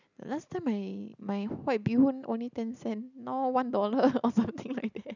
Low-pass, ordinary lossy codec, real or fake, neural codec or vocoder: 7.2 kHz; none; real; none